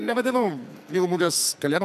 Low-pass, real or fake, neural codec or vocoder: 14.4 kHz; fake; codec, 44.1 kHz, 2.6 kbps, SNAC